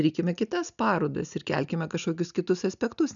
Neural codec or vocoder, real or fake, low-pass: none; real; 7.2 kHz